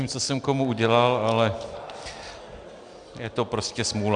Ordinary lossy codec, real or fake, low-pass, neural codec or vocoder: Opus, 64 kbps; real; 9.9 kHz; none